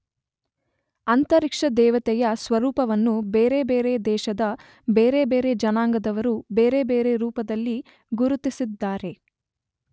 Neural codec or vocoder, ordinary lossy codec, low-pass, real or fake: none; none; none; real